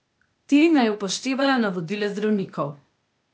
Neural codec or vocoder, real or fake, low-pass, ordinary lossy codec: codec, 16 kHz, 0.8 kbps, ZipCodec; fake; none; none